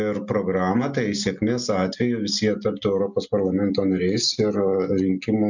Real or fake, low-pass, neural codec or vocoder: real; 7.2 kHz; none